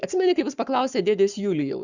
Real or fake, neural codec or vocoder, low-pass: fake; codec, 44.1 kHz, 7.8 kbps, Pupu-Codec; 7.2 kHz